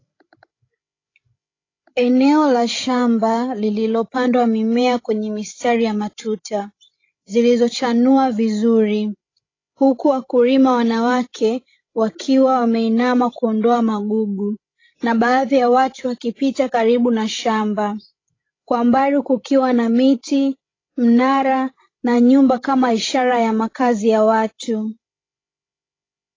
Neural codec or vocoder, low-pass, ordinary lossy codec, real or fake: codec, 16 kHz, 16 kbps, FreqCodec, larger model; 7.2 kHz; AAC, 32 kbps; fake